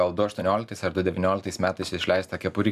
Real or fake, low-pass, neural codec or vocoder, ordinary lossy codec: real; 14.4 kHz; none; MP3, 96 kbps